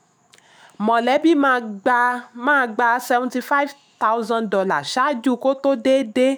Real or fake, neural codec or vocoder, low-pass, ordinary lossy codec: fake; autoencoder, 48 kHz, 128 numbers a frame, DAC-VAE, trained on Japanese speech; none; none